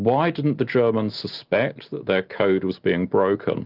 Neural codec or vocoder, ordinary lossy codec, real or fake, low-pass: none; Opus, 32 kbps; real; 5.4 kHz